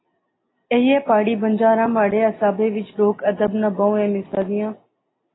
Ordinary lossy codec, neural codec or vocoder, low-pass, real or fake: AAC, 16 kbps; none; 7.2 kHz; real